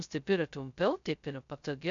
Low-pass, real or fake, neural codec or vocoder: 7.2 kHz; fake; codec, 16 kHz, 0.2 kbps, FocalCodec